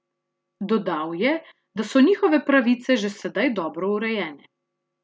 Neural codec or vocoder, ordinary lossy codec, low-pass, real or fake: none; none; none; real